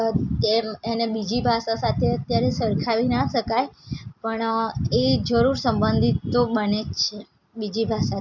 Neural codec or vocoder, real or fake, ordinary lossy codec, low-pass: none; real; none; 7.2 kHz